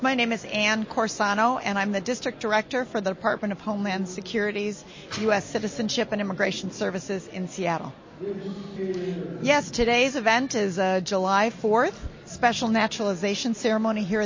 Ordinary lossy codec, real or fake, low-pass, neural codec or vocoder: MP3, 32 kbps; real; 7.2 kHz; none